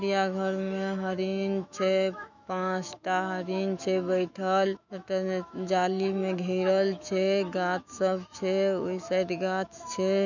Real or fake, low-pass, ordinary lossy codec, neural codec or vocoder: fake; 7.2 kHz; none; autoencoder, 48 kHz, 128 numbers a frame, DAC-VAE, trained on Japanese speech